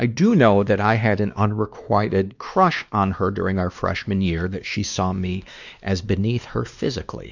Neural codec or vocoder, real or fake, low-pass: codec, 16 kHz, 2 kbps, X-Codec, WavLM features, trained on Multilingual LibriSpeech; fake; 7.2 kHz